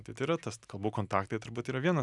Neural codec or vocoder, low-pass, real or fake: none; 10.8 kHz; real